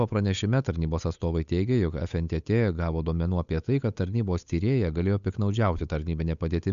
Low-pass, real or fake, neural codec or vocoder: 7.2 kHz; fake; codec, 16 kHz, 8 kbps, FunCodec, trained on Chinese and English, 25 frames a second